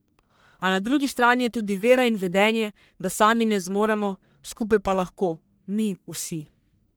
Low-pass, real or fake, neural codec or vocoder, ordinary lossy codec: none; fake; codec, 44.1 kHz, 1.7 kbps, Pupu-Codec; none